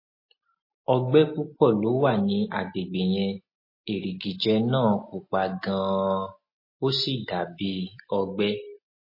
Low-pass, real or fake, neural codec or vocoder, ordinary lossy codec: 5.4 kHz; real; none; MP3, 24 kbps